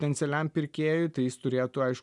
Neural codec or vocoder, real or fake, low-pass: none; real; 10.8 kHz